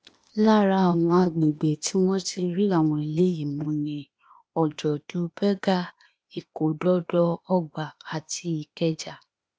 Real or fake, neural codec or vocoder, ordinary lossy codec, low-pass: fake; codec, 16 kHz, 0.8 kbps, ZipCodec; none; none